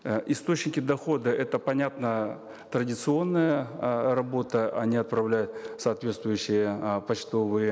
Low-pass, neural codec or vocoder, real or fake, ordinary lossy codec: none; none; real; none